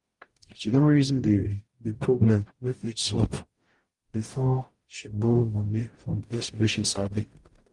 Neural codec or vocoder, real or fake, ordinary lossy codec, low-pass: codec, 44.1 kHz, 0.9 kbps, DAC; fake; Opus, 24 kbps; 10.8 kHz